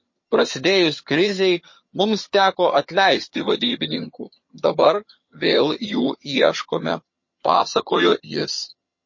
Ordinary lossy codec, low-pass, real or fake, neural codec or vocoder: MP3, 32 kbps; 7.2 kHz; fake; vocoder, 22.05 kHz, 80 mel bands, HiFi-GAN